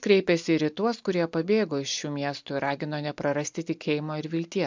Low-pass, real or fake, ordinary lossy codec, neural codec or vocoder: 7.2 kHz; real; MP3, 64 kbps; none